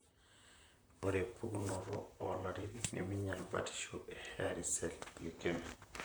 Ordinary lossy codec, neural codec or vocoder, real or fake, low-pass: none; vocoder, 44.1 kHz, 128 mel bands, Pupu-Vocoder; fake; none